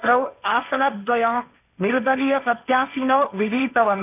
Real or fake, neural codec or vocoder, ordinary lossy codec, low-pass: fake; codec, 16 kHz, 1.1 kbps, Voila-Tokenizer; none; 3.6 kHz